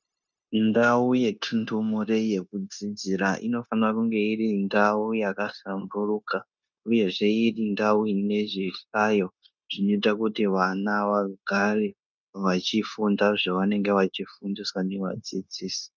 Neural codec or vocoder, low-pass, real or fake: codec, 16 kHz, 0.9 kbps, LongCat-Audio-Codec; 7.2 kHz; fake